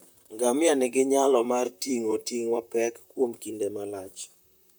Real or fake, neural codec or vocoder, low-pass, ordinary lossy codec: fake; vocoder, 44.1 kHz, 128 mel bands, Pupu-Vocoder; none; none